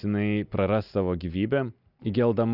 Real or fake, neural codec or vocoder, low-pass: real; none; 5.4 kHz